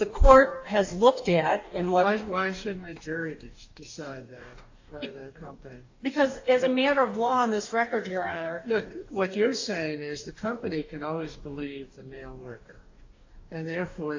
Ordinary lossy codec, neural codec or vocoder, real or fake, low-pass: AAC, 48 kbps; codec, 44.1 kHz, 2.6 kbps, DAC; fake; 7.2 kHz